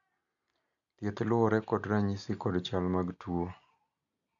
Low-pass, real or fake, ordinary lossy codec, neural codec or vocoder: 7.2 kHz; real; none; none